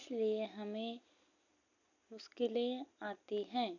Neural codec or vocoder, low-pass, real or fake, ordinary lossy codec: none; 7.2 kHz; real; none